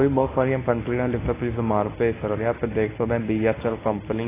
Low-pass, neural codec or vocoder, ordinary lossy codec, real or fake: 3.6 kHz; codec, 24 kHz, 0.9 kbps, WavTokenizer, medium speech release version 1; AAC, 16 kbps; fake